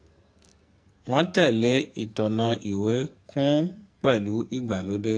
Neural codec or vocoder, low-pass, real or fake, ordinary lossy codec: codec, 44.1 kHz, 2.6 kbps, SNAC; 9.9 kHz; fake; AAC, 48 kbps